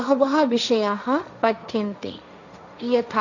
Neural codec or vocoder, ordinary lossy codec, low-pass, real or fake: codec, 16 kHz, 1.1 kbps, Voila-Tokenizer; none; 7.2 kHz; fake